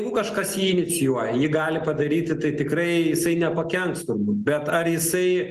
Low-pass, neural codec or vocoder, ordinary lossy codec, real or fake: 14.4 kHz; none; Opus, 24 kbps; real